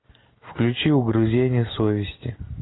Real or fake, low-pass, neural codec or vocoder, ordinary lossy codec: real; 7.2 kHz; none; AAC, 16 kbps